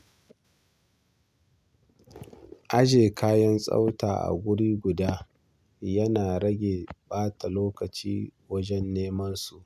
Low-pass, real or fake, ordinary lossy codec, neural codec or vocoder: 14.4 kHz; real; none; none